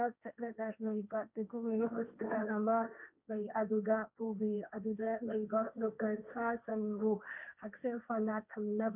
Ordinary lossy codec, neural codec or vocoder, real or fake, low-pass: none; codec, 16 kHz, 1.1 kbps, Voila-Tokenizer; fake; 3.6 kHz